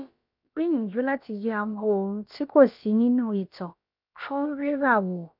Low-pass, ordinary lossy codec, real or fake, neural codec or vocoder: 5.4 kHz; none; fake; codec, 16 kHz, about 1 kbps, DyCAST, with the encoder's durations